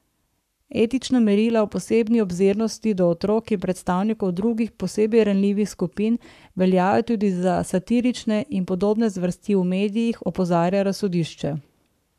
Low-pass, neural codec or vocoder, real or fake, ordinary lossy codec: 14.4 kHz; codec, 44.1 kHz, 7.8 kbps, Pupu-Codec; fake; none